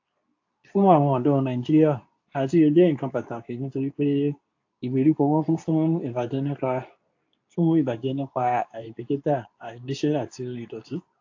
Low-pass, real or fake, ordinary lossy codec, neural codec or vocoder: 7.2 kHz; fake; AAC, 48 kbps; codec, 24 kHz, 0.9 kbps, WavTokenizer, medium speech release version 2